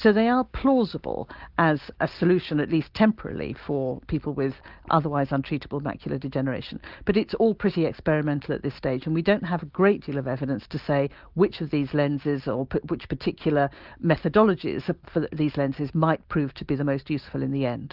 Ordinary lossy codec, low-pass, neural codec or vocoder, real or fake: Opus, 32 kbps; 5.4 kHz; none; real